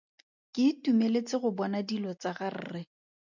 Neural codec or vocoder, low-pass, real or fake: none; 7.2 kHz; real